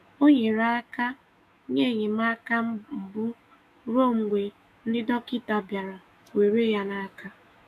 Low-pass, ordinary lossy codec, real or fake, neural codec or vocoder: 14.4 kHz; none; fake; autoencoder, 48 kHz, 128 numbers a frame, DAC-VAE, trained on Japanese speech